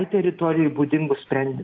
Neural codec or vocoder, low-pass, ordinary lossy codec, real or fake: none; 7.2 kHz; AAC, 48 kbps; real